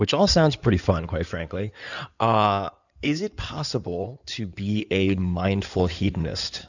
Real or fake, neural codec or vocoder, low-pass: fake; codec, 16 kHz in and 24 kHz out, 2.2 kbps, FireRedTTS-2 codec; 7.2 kHz